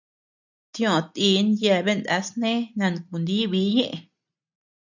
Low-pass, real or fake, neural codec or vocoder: 7.2 kHz; real; none